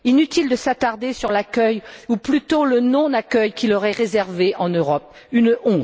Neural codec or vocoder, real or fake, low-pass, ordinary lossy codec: none; real; none; none